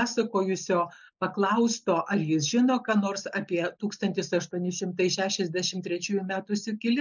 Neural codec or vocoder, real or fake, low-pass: none; real; 7.2 kHz